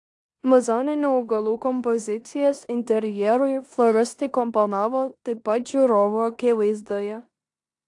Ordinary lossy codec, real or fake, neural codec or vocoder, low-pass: AAC, 64 kbps; fake; codec, 16 kHz in and 24 kHz out, 0.9 kbps, LongCat-Audio-Codec, four codebook decoder; 10.8 kHz